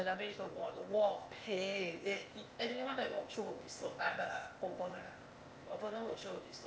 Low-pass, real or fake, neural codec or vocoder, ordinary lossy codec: none; fake; codec, 16 kHz, 0.8 kbps, ZipCodec; none